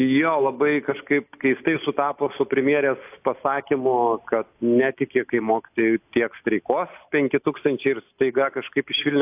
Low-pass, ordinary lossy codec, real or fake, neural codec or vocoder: 3.6 kHz; AAC, 32 kbps; real; none